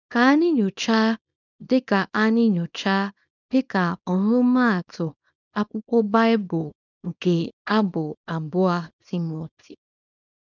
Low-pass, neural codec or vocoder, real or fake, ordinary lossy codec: 7.2 kHz; codec, 24 kHz, 0.9 kbps, WavTokenizer, small release; fake; none